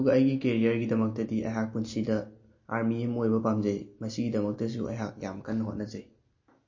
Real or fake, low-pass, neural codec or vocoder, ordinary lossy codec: real; 7.2 kHz; none; MP3, 32 kbps